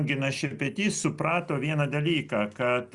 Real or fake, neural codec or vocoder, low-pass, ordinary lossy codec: real; none; 10.8 kHz; Opus, 64 kbps